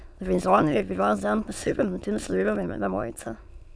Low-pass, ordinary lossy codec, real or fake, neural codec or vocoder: none; none; fake; autoencoder, 22.05 kHz, a latent of 192 numbers a frame, VITS, trained on many speakers